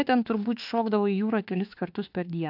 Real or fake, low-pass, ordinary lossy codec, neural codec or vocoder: fake; 5.4 kHz; AAC, 48 kbps; autoencoder, 48 kHz, 32 numbers a frame, DAC-VAE, trained on Japanese speech